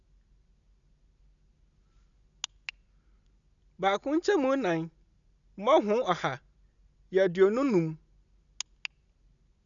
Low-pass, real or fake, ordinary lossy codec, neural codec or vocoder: 7.2 kHz; real; none; none